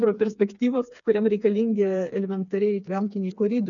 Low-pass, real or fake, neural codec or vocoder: 7.2 kHz; fake; codec, 16 kHz, 4 kbps, FreqCodec, smaller model